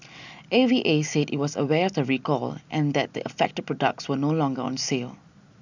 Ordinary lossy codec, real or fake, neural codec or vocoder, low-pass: none; real; none; 7.2 kHz